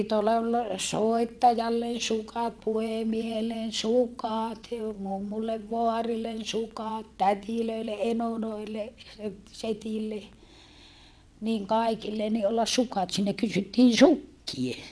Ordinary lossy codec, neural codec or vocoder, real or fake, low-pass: none; vocoder, 22.05 kHz, 80 mel bands, WaveNeXt; fake; none